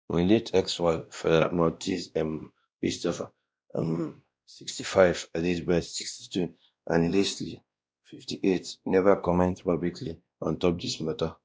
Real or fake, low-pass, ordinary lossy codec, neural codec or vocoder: fake; none; none; codec, 16 kHz, 1 kbps, X-Codec, WavLM features, trained on Multilingual LibriSpeech